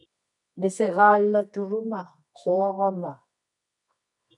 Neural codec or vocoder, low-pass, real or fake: codec, 24 kHz, 0.9 kbps, WavTokenizer, medium music audio release; 10.8 kHz; fake